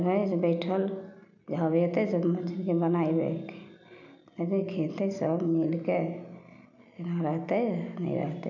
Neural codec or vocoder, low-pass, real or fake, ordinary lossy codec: none; 7.2 kHz; real; none